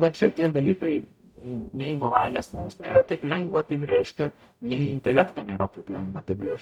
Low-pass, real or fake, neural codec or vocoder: 19.8 kHz; fake; codec, 44.1 kHz, 0.9 kbps, DAC